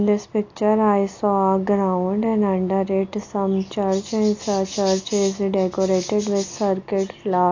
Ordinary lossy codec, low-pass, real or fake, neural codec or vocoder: AAC, 48 kbps; 7.2 kHz; real; none